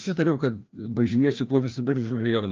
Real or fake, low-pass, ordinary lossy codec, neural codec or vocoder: fake; 7.2 kHz; Opus, 24 kbps; codec, 16 kHz, 1 kbps, FreqCodec, larger model